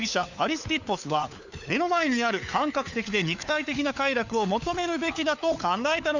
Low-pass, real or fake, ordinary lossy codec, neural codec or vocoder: 7.2 kHz; fake; none; codec, 16 kHz, 4 kbps, FunCodec, trained on LibriTTS, 50 frames a second